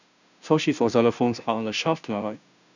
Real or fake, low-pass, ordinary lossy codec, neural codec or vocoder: fake; 7.2 kHz; none; codec, 16 kHz, 0.5 kbps, FunCodec, trained on Chinese and English, 25 frames a second